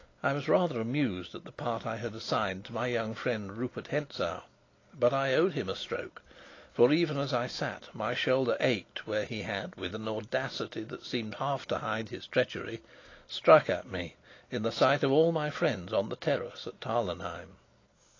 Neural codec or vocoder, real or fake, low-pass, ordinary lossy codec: none; real; 7.2 kHz; AAC, 32 kbps